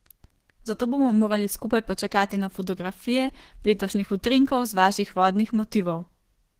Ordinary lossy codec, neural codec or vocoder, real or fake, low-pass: Opus, 16 kbps; codec, 32 kHz, 1.9 kbps, SNAC; fake; 14.4 kHz